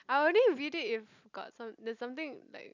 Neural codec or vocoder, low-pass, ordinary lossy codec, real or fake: none; 7.2 kHz; none; real